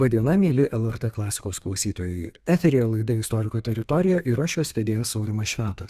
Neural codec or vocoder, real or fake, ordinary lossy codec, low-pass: codec, 32 kHz, 1.9 kbps, SNAC; fake; Opus, 64 kbps; 14.4 kHz